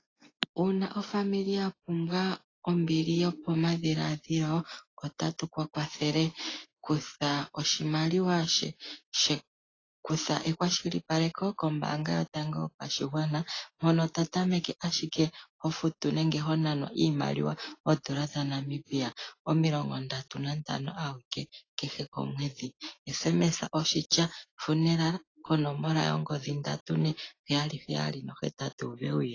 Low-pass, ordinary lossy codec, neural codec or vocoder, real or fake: 7.2 kHz; AAC, 32 kbps; none; real